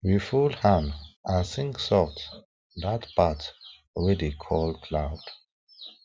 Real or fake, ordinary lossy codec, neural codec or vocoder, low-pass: real; none; none; none